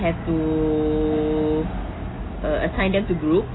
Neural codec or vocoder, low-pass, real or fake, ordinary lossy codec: none; 7.2 kHz; real; AAC, 16 kbps